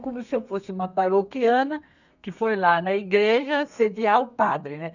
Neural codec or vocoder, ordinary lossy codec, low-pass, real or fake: codec, 32 kHz, 1.9 kbps, SNAC; none; 7.2 kHz; fake